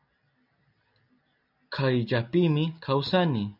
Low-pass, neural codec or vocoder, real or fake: 5.4 kHz; none; real